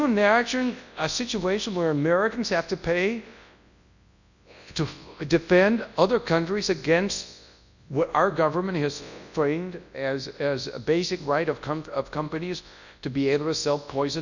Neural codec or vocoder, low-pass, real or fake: codec, 24 kHz, 0.9 kbps, WavTokenizer, large speech release; 7.2 kHz; fake